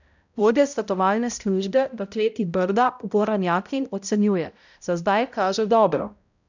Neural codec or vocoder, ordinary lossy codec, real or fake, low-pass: codec, 16 kHz, 0.5 kbps, X-Codec, HuBERT features, trained on balanced general audio; none; fake; 7.2 kHz